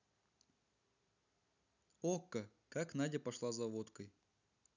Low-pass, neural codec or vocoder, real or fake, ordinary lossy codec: 7.2 kHz; none; real; none